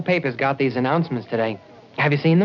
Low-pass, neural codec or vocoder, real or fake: 7.2 kHz; none; real